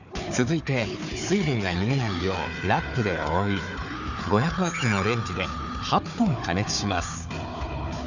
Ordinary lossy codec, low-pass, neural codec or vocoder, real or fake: none; 7.2 kHz; codec, 16 kHz, 4 kbps, FunCodec, trained on Chinese and English, 50 frames a second; fake